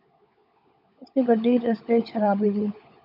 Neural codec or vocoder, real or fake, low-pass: codec, 16 kHz, 16 kbps, FunCodec, trained on LibriTTS, 50 frames a second; fake; 5.4 kHz